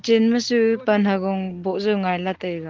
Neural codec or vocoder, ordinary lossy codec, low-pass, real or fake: none; Opus, 16 kbps; 7.2 kHz; real